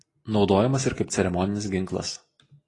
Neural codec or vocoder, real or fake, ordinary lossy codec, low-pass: none; real; AAC, 32 kbps; 10.8 kHz